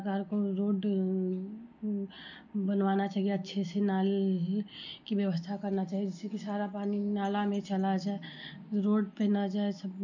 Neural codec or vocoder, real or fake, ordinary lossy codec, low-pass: none; real; none; 7.2 kHz